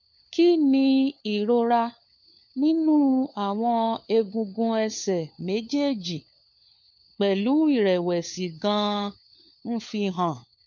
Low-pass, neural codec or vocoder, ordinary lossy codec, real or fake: 7.2 kHz; codec, 16 kHz, 4 kbps, FunCodec, trained on LibriTTS, 50 frames a second; MP3, 64 kbps; fake